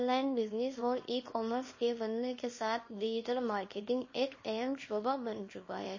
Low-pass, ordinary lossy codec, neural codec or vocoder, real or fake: 7.2 kHz; MP3, 32 kbps; codec, 24 kHz, 0.9 kbps, WavTokenizer, small release; fake